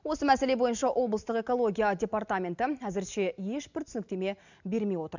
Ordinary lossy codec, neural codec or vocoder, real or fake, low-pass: none; none; real; 7.2 kHz